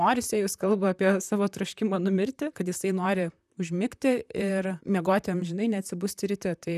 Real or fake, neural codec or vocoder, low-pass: fake; vocoder, 44.1 kHz, 128 mel bands, Pupu-Vocoder; 14.4 kHz